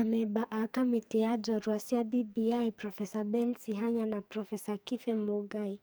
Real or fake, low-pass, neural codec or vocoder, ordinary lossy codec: fake; none; codec, 44.1 kHz, 2.6 kbps, SNAC; none